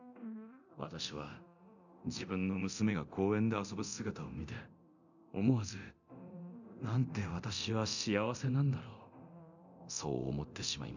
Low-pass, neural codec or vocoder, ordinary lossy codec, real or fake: 7.2 kHz; codec, 24 kHz, 0.9 kbps, DualCodec; none; fake